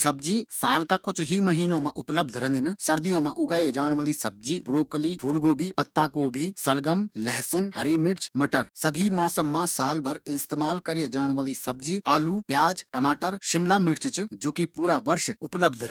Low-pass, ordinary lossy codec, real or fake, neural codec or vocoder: none; none; fake; codec, 44.1 kHz, 2.6 kbps, DAC